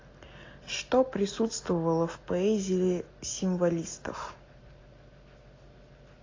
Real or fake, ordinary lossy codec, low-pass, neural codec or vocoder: real; AAC, 32 kbps; 7.2 kHz; none